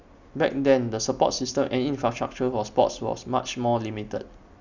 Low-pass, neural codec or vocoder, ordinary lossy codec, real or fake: 7.2 kHz; none; none; real